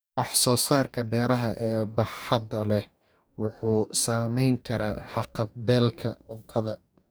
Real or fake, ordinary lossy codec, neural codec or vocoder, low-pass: fake; none; codec, 44.1 kHz, 2.6 kbps, DAC; none